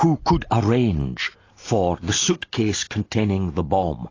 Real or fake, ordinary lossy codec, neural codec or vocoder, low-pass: real; AAC, 32 kbps; none; 7.2 kHz